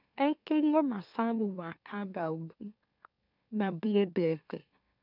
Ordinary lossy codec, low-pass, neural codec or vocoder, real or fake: none; 5.4 kHz; autoencoder, 44.1 kHz, a latent of 192 numbers a frame, MeloTTS; fake